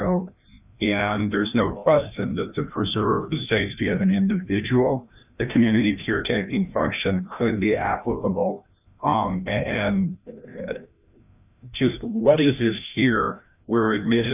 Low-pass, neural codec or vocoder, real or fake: 3.6 kHz; codec, 16 kHz, 1 kbps, FreqCodec, larger model; fake